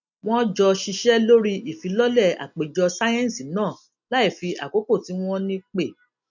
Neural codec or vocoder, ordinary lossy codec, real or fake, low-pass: none; none; real; 7.2 kHz